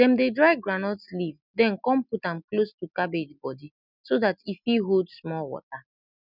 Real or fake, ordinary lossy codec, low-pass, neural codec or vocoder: real; none; 5.4 kHz; none